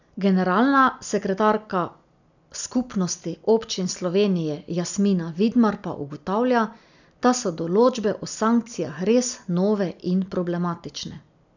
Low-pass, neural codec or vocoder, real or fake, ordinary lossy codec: 7.2 kHz; none; real; none